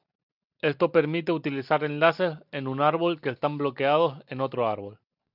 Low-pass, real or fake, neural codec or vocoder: 5.4 kHz; real; none